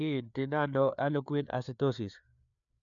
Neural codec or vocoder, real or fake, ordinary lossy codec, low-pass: codec, 16 kHz, 4 kbps, FreqCodec, larger model; fake; none; 7.2 kHz